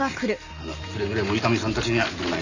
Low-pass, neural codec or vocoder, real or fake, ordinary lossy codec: 7.2 kHz; none; real; none